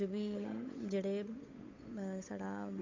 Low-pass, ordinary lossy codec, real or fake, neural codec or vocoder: 7.2 kHz; MP3, 48 kbps; fake; codec, 16 kHz, 8 kbps, FunCodec, trained on Chinese and English, 25 frames a second